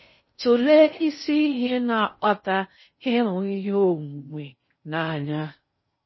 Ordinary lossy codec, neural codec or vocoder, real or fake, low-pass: MP3, 24 kbps; codec, 16 kHz in and 24 kHz out, 0.6 kbps, FocalCodec, streaming, 4096 codes; fake; 7.2 kHz